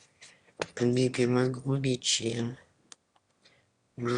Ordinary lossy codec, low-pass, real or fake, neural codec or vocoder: Opus, 64 kbps; 9.9 kHz; fake; autoencoder, 22.05 kHz, a latent of 192 numbers a frame, VITS, trained on one speaker